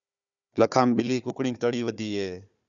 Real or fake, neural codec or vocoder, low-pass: fake; codec, 16 kHz, 4 kbps, FunCodec, trained on Chinese and English, 50 frames a second; 7.2 kHz